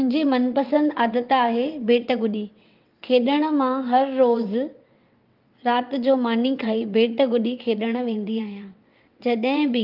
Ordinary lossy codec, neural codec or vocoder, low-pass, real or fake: Opus, 24 kbps; vocoder, 22.05 kHz, 80 mel bands, WaveNeXt; 5.4 kHz; fake